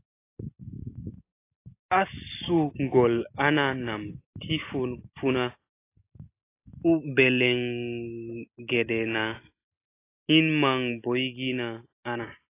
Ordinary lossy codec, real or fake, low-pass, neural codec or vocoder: AAC, 24 kbps; real; 3.6 kHz; none